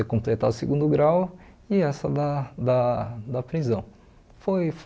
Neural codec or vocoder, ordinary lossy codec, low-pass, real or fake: none; none; none; real